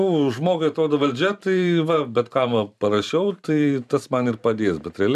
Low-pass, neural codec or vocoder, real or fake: 14.4 kHz; vocoder, 44.1 kHz, 128 mel bands every 512 samples, BigVGAN v2; fake